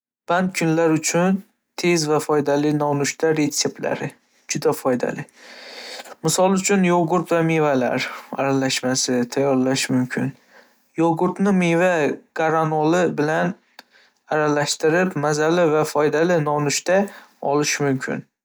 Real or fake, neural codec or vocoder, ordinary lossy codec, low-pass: real; none; none; none